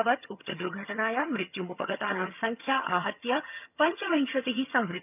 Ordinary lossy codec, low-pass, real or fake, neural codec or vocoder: none; 3.6 kHz; fake; vocoder, 22.05 kHz, 80 mel bands, HiFi-GAN